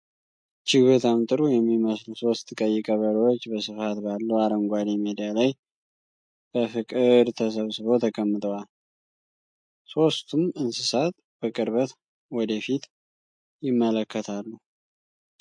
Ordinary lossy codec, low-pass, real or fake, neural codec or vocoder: MP3, 48 kbps; 9.9 kHz; real; none